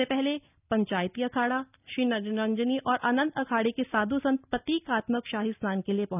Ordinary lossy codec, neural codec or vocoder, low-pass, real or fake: none; none; 3.6 kHz; real